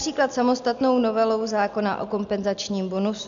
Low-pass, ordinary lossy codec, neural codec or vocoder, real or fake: 7.2 kHz; MP3, 96 kbps; none; real